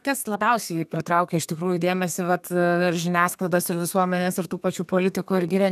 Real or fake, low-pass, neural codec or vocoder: fake; 14.4 kHz; codec, 32 kHz, 1.9 kbps, SNAC